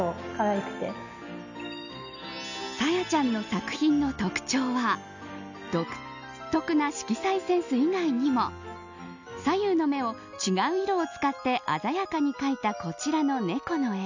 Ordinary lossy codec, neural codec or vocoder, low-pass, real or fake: none; none; 7.2 kHz; real